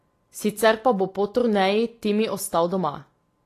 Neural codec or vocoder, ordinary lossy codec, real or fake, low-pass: none; AAC, 48 kbps; real; 14.4 kHz